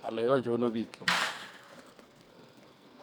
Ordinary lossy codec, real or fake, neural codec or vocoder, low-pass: none; fake; codec, 44.1 kHz, 2.6 kbps, SNAC; none